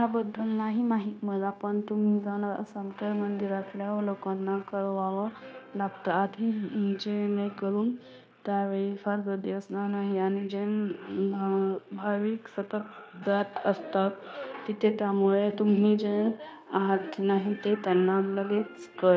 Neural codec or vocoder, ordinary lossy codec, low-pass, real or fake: codec, 16 kHz, 0.9 kbps, LongCat-Audio-Codec; none; none; fake